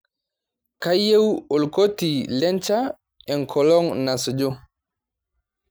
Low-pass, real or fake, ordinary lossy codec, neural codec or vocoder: none; real; none; none